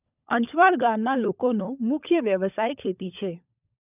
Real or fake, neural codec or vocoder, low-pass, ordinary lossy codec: fake; codec, 16 kHz, 16 kbps, FunCodec, trained on LibriTTS, 50 frames a second; 3.6 kHz; none